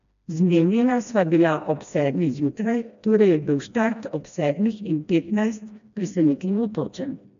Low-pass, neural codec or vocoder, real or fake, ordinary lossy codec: 7.2 kHz; codec, 16 kHz, 1 kbps, FreqCodec, smaller model; fake; MP3, 64 kbps